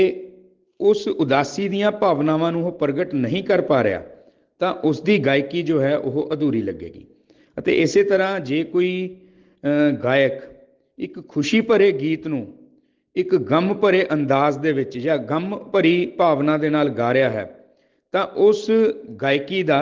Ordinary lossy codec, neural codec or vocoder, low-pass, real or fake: Opus, 16 kbps; none; 7.2 kHz; real